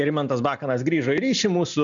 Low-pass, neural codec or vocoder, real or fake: 7.2 kHz; none; real